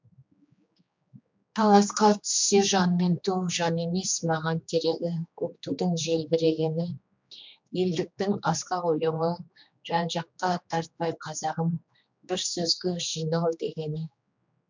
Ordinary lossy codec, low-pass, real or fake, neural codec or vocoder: MP3, 64 kbps; 7.2 kHz; fake; codec, 16 kHz, 2 kbps, X-Codec, HuBERT features, trained on general audio